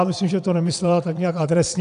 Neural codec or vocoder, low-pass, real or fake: vocoder, 22.05 kHz, 80 mel bands, WaveNeXt; 9.9 kHz; fake